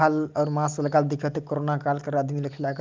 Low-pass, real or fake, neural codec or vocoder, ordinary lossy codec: 7.2 kHz; real; none; Opus, 24 kbps